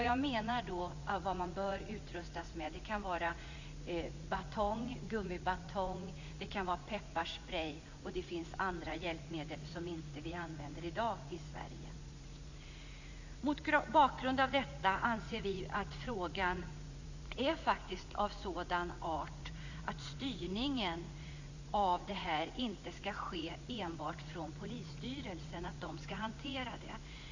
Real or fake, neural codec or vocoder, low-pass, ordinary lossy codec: fake; vocoder, 44.1 kHz, 80 mel bands, Vocos; 7.2 kHz; Opus, 64 kbps